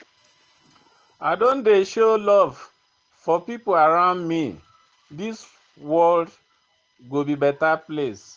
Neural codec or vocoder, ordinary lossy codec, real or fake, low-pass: none; Opus, 16 kbps; real; 7.2 kHz